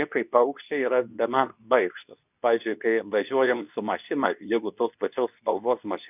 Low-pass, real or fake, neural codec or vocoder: 3.6 kHz; fake; codec, 24 kHz, 0.9 kbps, WavTokenizer, medium speech release version 2